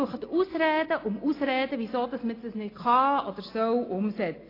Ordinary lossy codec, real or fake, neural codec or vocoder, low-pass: AAC, 24 kbps; real; none; 5.4 kHz